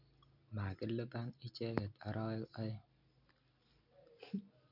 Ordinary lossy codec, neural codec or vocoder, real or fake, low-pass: none; none; real; 5.4 kHz